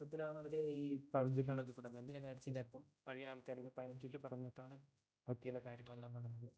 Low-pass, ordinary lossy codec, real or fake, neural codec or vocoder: none; none; fake; codec, 16 kHz, 0.5 kbps, X-Codec, HuBERT features, trained on general audio